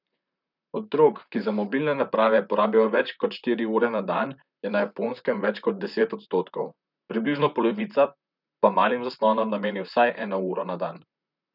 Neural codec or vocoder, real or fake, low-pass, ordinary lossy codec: vocoder, 44.1 kHz, 128 mel bands, Pupu-Vocoder; fake; 5.4 kHz; none